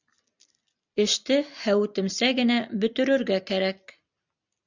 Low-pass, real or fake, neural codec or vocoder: 7.2 kHz; real; none